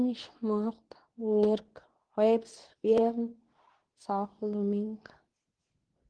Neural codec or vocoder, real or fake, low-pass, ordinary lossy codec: codec, 24 kHz, 0.9 kbps, WavTokenizer, medium speech release version 2; fake; 9.9 kHz; Opus, 16 kbps